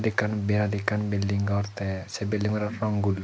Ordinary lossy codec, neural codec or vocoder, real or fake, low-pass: none; none; real; none